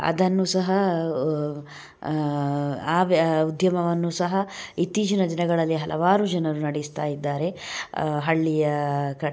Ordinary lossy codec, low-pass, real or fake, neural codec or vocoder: none; none; real; none